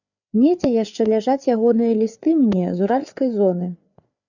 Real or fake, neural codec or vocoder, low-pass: fake; codec, 16 kHz, 4 kbps, FreqCodec, larger model; 7.2 kHz